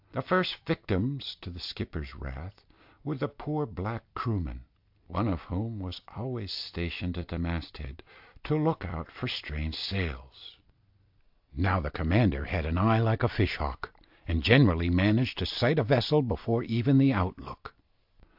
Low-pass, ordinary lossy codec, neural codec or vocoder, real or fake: 5.4 kHz; AAC, 48 kbps; none; real